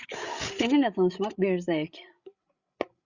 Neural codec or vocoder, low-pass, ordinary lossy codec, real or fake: codec, 16 kHz, 16 kbps, FreqCodec, larger model; 7.2 kHz; Opus, 64 kbps; fake